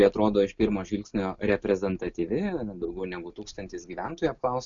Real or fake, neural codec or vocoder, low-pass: real; none; 7.2 kHz